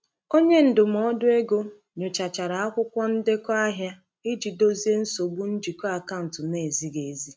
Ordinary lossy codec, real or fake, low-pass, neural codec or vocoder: none; real; none; none